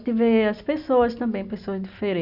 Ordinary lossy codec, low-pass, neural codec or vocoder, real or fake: none; 5.4 kHz; none; real